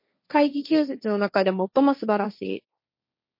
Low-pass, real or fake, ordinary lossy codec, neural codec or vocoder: 5.4 kHz; fake; MP3, 32 kbps; codec, 16 kHz, 1.1 kbps, Voila-Tokenizer